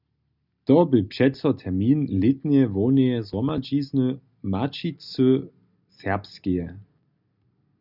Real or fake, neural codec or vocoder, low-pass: real; none; 5.4 kHz